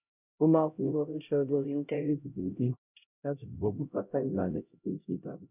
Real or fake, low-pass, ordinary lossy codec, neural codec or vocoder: fake; 3.6 kHz; none; codec, 16 kHz, 0.5 kbps, X-Codec, HuBERT features, trained on LibriSpeech